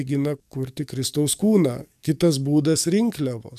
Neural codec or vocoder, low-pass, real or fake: codec, 44.1 kHz, 7.8 kbps, DAC; 14.4 kHz; fake